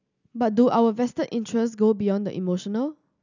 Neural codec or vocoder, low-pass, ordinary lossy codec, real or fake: none; 7.2 kHz; none; real